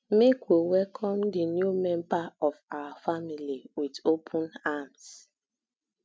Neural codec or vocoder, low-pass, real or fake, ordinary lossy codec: none; none; real; none